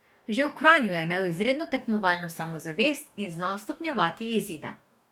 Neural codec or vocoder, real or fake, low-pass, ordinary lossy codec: codec, 44.1 kHz, 2.6 kbps, DAC; fake; 19.8 kHz; none